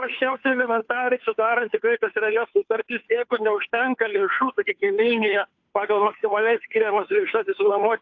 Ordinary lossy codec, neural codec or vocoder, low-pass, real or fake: AAC, 48 kbps; codec, 16 kHz, 2 kbps, FunCodec, trained on Chinese and English, 25 frames a second; 7.2 kHz; fake